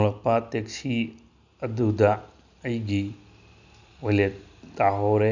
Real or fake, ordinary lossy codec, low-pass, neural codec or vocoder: real; none; 7.2 kHz; none